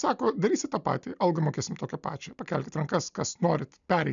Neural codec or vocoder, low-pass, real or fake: none; 7.2 kHz; real